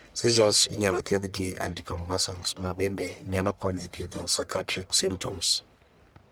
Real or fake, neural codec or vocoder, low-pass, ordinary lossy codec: fake; codec, 44.1 kHz, 1.7 kbps, Pupu-Codec; none; none